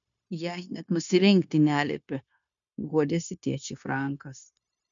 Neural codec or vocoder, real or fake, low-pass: codec, 16 kHz, 0.9 kbps, LongCat-Audio-Codec; fake; 7.2 kHz